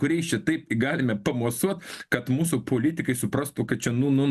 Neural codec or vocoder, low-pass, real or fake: none; 14.4 kHz; real